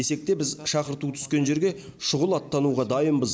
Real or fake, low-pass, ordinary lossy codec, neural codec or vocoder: real; none; none; none